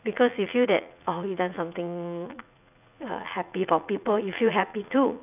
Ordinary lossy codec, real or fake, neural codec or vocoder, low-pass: none; fake; vocoder, 44.1 kHz, 80 mel bands, Vocos; 3.6 kHz